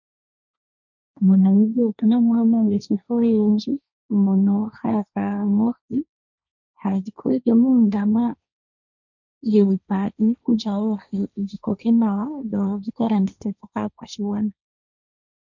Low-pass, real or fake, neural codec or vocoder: 7.2 kHz; fake; codec, 16 kHz, 1.1 kbps, Voila-Tokenizer